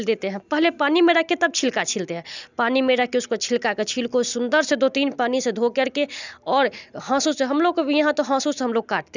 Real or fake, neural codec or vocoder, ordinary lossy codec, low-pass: real; none; none; 7.2 kHz